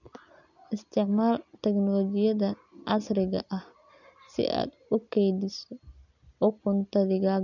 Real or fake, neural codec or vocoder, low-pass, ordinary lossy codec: real; none; 7.2 kHz; none